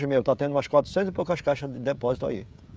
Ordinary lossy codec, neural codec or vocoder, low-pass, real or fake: none; codec, 16 kHz, 16 kbps, FreqCodec, smaller model; none; fake